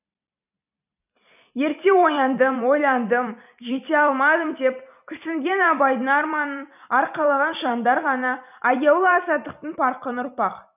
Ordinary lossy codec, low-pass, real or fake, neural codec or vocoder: none; 3.6 kHz; fake; vocoder, 44.1 kHz, 128 mel bands every 256 samples, BigVGAN v2